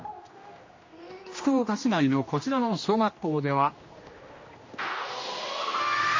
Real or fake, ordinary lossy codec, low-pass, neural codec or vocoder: fake; MP3, 32 kbps; 7.2 kHz; codec, 16 kHz, 1 kbps, X-Codec, HuBERT features, trained on general audio